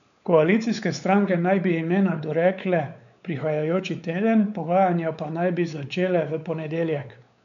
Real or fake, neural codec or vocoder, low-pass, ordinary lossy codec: fake; codec, 16 kHz, 4 kbps, X-Codec, WavLM features, trained on Multilingual LibriSpeech; 7.2 kHz; none